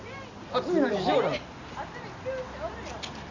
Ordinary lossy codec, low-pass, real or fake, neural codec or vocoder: none; 7.2 kHz; real; none